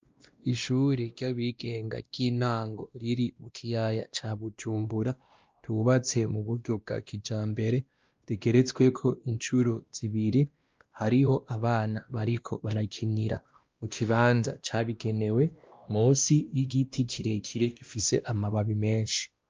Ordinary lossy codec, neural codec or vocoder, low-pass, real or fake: Opus, 24 kbps; codec, 16 kHz, 1 kbps, X-Codec, WavLM features, trained on Multilingual LibriSpeech; 7.2 kHz; fake